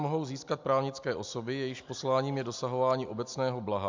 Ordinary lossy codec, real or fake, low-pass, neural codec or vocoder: MP3, 64 kbps; real; 7.2 kHz; none